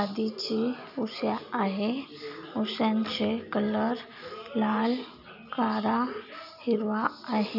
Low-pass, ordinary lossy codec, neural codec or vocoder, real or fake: 5.4 kHz; none; none; real